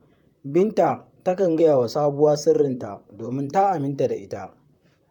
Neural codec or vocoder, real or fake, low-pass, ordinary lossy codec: vocoder, 44.1 kHz, 128 mel bands, Pupu-Vocoder; fake; 19.8 kHz; none